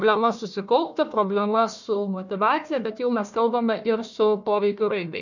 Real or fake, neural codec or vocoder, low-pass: fake; codec, 16 kHz, 1 kbps, FunCodec, trained on Chinese and English, 50 frames a second; 7.2 kHz